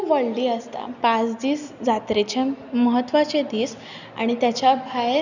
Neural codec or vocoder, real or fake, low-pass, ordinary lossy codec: none; real; 7.2 kHz; none